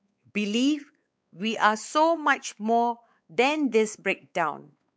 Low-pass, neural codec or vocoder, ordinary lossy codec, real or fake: none; codec, 16 kHz, 4 kbps, X-Codec, WavLM features, trained on Multilingual LibriSpeech; none; fake